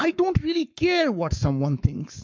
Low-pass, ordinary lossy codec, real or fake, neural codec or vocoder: 7.2 kHz; MP3, 64 kbps; fake; codec, 44.1 kHz, 7.8 kbps, Pupu-Codec